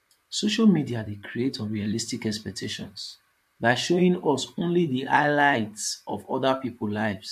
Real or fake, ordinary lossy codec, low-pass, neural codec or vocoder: fake; MP3, 64 kbps; 14.4 kHz; vocoder, 44.1 kHz, 128 mel bands, Pupu-Vocoder